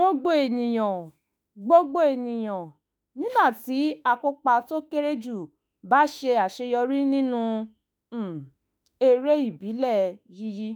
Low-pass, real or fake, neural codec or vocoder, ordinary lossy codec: none; fake; autoencoder, 48 kHz, 32 numbers a frame, DAC-VAE, trained on Japanese speech; none